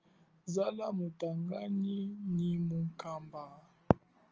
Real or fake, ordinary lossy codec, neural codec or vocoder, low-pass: real; Opus, 24 kbps; none; 7.2 kHz